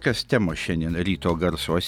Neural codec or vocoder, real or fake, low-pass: none; real; 19.8 kHz